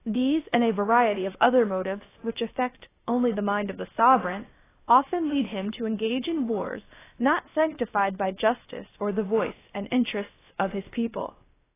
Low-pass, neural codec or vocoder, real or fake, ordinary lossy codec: 3.6 kHz; codec, 16 kHz, about 1 kbps, DyCAST, with the encoder's durations; fake; AAC, 16 kbps